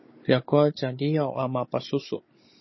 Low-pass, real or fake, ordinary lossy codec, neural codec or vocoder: 7.2 kHz; fake; MP3, 24 kbps; codec, 16 kHz, 16 kbps, FunCodec, trained on Chinese and English, 50 frames a second